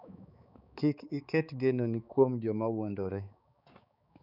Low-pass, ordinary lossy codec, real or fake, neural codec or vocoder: 5.4 kHz; none; fake; codec, 16 kHz, 4 kbps, X-Codec, HuBERT features, trained on balanced general audio